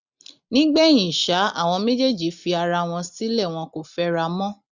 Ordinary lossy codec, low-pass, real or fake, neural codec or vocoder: none; 7.2 kHz; real; none